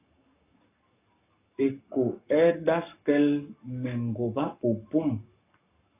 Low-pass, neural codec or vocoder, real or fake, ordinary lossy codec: 3.6 kHz; codec, 44.1 kHz, 7.8 kbps, Pupu-Codec; fake; AAC, 24 kbps